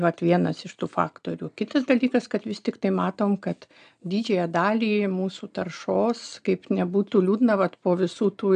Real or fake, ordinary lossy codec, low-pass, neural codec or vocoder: real; AAC, 96 kbps; 9.9 kHz; none